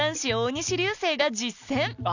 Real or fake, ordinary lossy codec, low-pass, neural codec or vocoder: real; none; 7.2 kHz; none